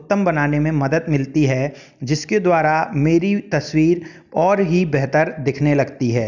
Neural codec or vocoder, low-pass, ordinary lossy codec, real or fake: none; 7.2 kHz; none; real